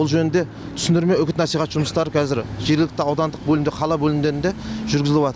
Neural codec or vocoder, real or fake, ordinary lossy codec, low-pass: none; real; none; none